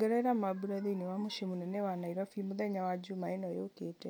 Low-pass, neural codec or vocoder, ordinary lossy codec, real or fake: none; none; none; real